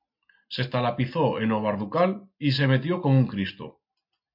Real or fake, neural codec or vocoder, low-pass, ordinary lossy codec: real; none; 5.4 kHz; MP3, 48 kbps